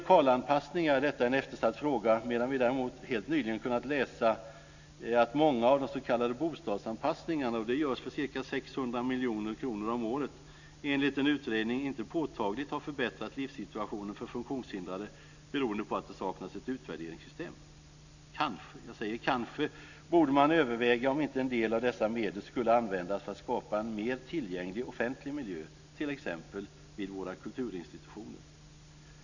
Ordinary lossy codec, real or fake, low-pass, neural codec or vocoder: none; real; 7.2 kHz; none